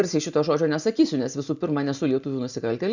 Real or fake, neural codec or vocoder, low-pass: real; none; 7.2 kHz